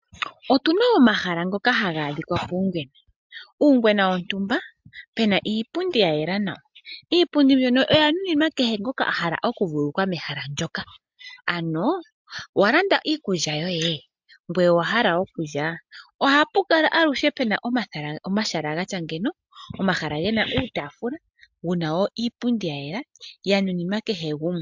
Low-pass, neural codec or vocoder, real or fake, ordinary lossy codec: 7.2 kHz; none; real; MP3, 64 kbps